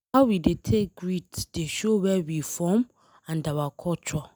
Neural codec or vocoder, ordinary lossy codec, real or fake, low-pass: none; none; real; none